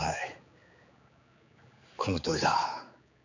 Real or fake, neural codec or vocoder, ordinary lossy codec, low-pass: fake; codec, 16 kHz, 4 kbps, X-Codec, HuBERT features, trained on general audio; none; 7.2 kHz